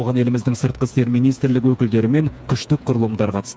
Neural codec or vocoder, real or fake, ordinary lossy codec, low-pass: codec, 16 kHz, 4 kbps, FreqCodec, smaller model; fake; none; none